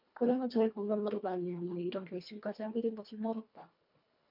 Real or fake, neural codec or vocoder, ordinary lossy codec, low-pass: fake; codec, 24 kHz, 1.5 kbps, HILCodec; MP3, 48 kbps; 5.4 kHz